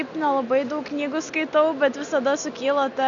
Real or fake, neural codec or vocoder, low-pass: real; none; 7.2 kHz